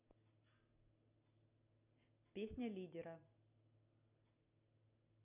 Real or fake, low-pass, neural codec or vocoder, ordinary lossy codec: real; 3.6 kHz; none; none